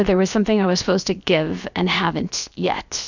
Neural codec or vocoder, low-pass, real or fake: codec, 16 kHz, 0.7 kbps, FocalCodec; 7.2 kHz; fake